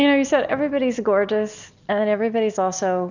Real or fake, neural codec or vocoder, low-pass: real; none; 7.2 kHz